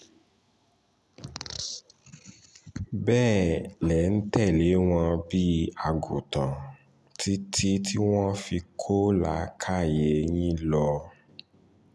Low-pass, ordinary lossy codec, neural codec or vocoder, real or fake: none; none; none; real